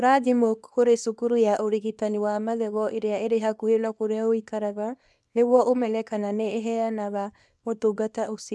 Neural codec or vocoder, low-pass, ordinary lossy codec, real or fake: codec, 24 kHz, 0.9 kbps, WavTokenizer, small release; none; none; fake